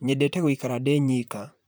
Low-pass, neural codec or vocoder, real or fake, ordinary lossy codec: none; vocoder, 44.1 kHz, 128 mel bands, Pupu-Vocoder; fake; none